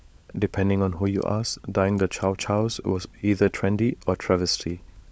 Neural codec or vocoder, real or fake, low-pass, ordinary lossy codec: codec, 16 kHz, 16 kbps, FunCodec, trained on LibriTTS, 50 frames a second; fake; none; none